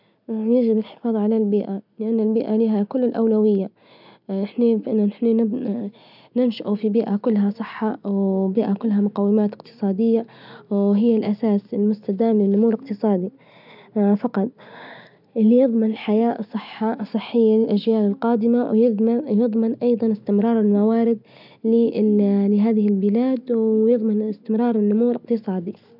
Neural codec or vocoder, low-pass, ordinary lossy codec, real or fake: none; 5.4 kHz; none; real